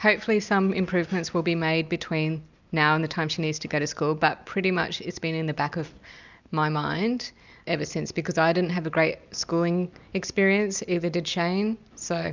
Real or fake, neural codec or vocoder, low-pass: real; none; 7.2 kHz